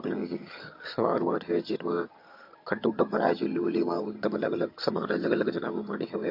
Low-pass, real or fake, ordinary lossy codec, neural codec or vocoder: 5.4 kHz; fake; MP3, 32 kbps; vocoder, 22.05 kHz, 80 mel bands, HiFi-GAN